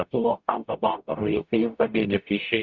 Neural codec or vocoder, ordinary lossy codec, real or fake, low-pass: codec, 44.1 kHz, 0.9 kbps, DAC; Opus, 64 kbps; fake; 7.2 kHz